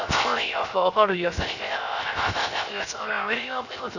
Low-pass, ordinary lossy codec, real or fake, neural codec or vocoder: 7.2 kHz; none; fake; codec, 16 kHz, 0.3 kbps, FocalCodec